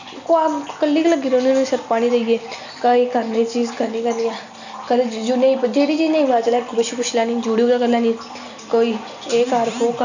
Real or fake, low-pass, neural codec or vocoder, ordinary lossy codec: real; 7.2 kHz; none; none